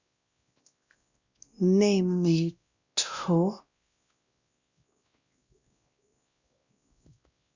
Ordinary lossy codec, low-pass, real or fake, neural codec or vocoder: Opus, 64 kbps; 7.2 kHz; fake; codec, 16 kHz, 1 kbps, X-Codec, WavLM features, trained on Multilingual LibriSpeech